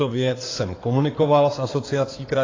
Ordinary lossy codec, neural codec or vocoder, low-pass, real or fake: AAC, 32 kbps; codec, 16 kHz in and 24 kHz out, 2.2 kbps, FireRedTTS-2 codec; 7.2 kHz; fake